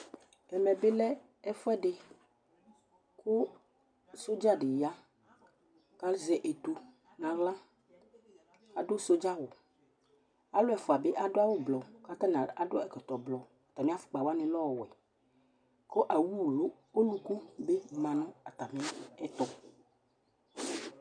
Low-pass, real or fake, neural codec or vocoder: 9.9 kHz; real; none